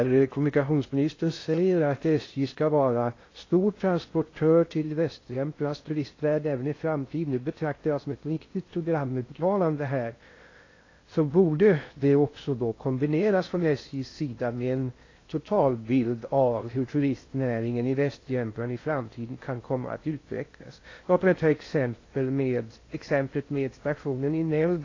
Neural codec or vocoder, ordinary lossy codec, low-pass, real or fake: codec, 16 kHz in and 24 kHz out, 0.6 kbps, FocalCodec, streaming, 2048 codes; AAC, 32 kbps; 7.2 kHz; fake